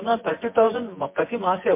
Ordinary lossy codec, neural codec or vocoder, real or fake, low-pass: MP3, 24 kbps; vocoder, 24 kHz, 100 mel bands, Vocos; fake; 3.6 kHz